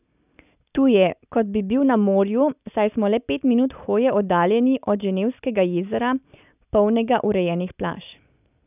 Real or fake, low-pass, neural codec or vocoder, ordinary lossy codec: real; 3.6 kHz; none; none